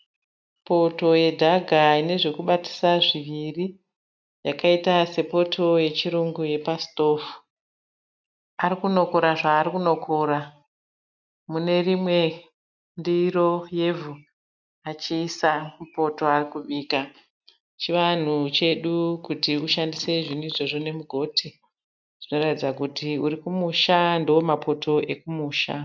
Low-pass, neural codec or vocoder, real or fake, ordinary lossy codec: 7.2 kHz; none; real; MP3, 64 kbps